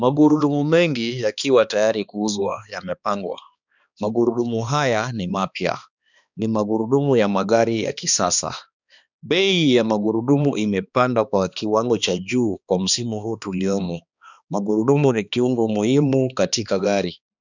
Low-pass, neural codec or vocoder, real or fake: 7.2 kHz; codec, 16 kHz, 2 kbps, X-Codec, HuBERT features, trained on balanced general audio; fake